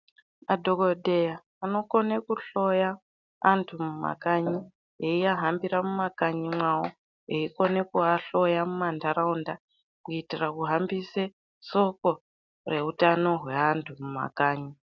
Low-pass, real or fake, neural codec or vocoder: 7.2 kHz; real; none